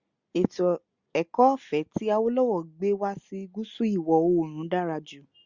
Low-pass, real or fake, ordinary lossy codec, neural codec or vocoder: 7.2 kHz; real; Opus, 64 kbps; none